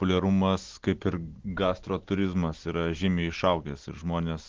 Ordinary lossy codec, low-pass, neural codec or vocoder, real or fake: Opus, 16 kbps; 7.2 kHz; none; real